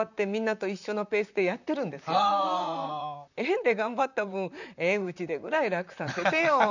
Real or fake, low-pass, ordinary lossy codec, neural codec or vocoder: fake; 7.2 kHz; none; vocoder, 44.1 kHz, 128 mel bands, Pupu-Vocoder